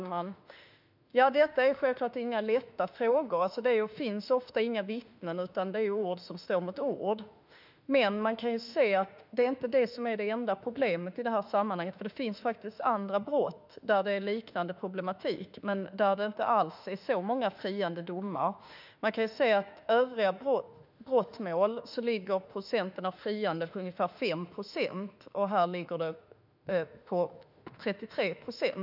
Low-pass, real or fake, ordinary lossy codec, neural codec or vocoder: 5.4 kHz; fake; none; autoencoder, 48 kHz, 32 numbers a frame, DAC-VAE, trained on Japanese speech